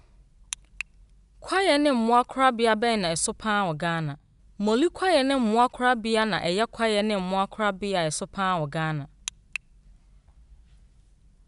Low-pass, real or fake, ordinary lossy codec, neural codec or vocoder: 10.8 kHz; real; none; none